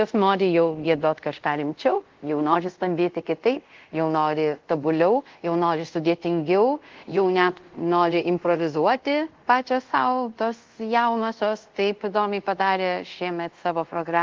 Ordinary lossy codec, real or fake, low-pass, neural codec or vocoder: Opus, 16 kbps; fake; 7.2 kHz; codec, 24 kHz, 0.5 kbps, DualCodec